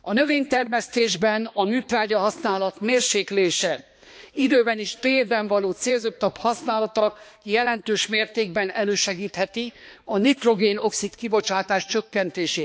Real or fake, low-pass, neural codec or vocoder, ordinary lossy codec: fake; none; codec, 16 kHz, 2 kbps, X-Codec, HuBERT features, trained on balanced general audio; none